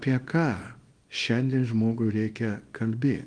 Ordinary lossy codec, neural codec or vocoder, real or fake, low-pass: Opus, 24 kbps; codec, 24 kHz, 0.9 kbps, WavTokenizer, small release; fake; 9.9 kHz